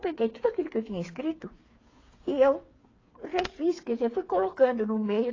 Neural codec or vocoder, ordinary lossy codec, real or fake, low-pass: codec, 16 kHz, 8 kbps, FreqCodec, smaller model; AAC, 32 kbps; fake; 7.2 kHz